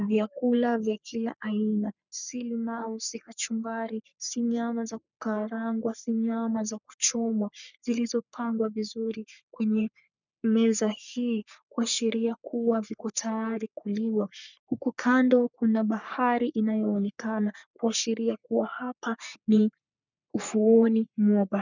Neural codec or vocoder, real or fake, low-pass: codec, 44.1 kHz, 3.4 kbps, Pupu-Codec; fake; 7.2 kHz